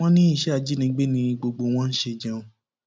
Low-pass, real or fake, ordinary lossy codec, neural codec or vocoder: none; real; none; none